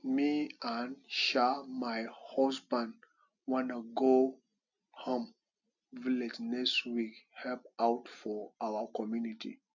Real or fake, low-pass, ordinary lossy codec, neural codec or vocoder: real; 7.2 kHz; none; none